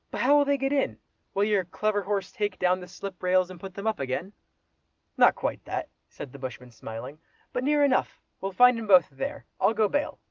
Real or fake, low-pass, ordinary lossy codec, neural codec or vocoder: real; 7.2 kHz; Opus, 32 kbps; none